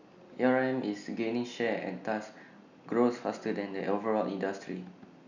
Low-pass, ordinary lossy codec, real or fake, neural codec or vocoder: 7.2 kHz; none; real; none